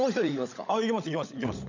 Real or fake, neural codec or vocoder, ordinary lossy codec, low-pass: fake; codec, 16 kHz, 16 kbps, FunCodec, trained on LibriTTS, 50 frames a second; none; 7.2 kHz